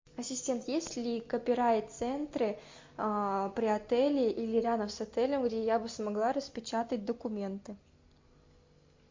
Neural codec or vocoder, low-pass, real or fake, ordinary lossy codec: none; 7.2 kHz; real; MP3, 48 kbps